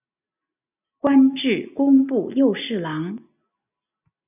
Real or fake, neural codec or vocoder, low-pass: real; none; 3.6 kHz